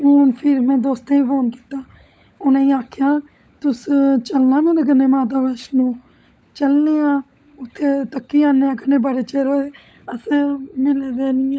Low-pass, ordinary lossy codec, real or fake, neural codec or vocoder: none; none; fake; codec, 16 kHz, 16 kbps, FunCodec, trained on LibriTTS, 50 frames a second